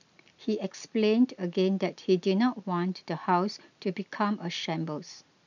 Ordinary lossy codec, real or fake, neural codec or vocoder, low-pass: none; real; none; 7.2 kHz